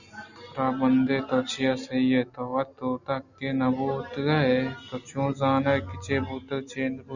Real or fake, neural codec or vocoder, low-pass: real; none; 7.2 kHz